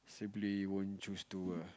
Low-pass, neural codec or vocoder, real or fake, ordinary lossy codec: none; none; real; none